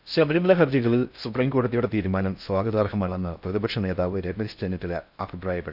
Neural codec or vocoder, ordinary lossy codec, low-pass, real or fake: codec, 16 kHz in and 24 kHz out, 0.6 kbps, FocalCodec, streaming, 4096 codes; none; 5.4 kHz; fake